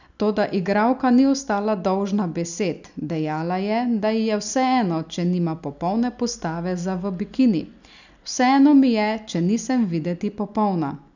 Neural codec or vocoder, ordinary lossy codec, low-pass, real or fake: none; none; 7.2 kHz; real